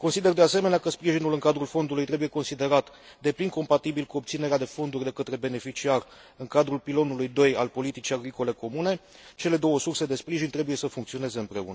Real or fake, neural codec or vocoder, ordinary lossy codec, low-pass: real; none; none; none